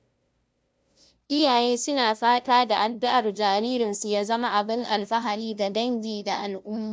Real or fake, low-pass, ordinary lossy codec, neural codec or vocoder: fake; none; none; codec, 16 kHz, 0.5 kbps, FunCodec, trained on LibriTTS, 25 frames a second